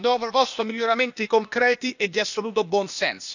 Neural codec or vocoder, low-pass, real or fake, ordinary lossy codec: codec, 16 kHz, 0.8 kbps, ZipCodec; 7.2 kHz; fake; none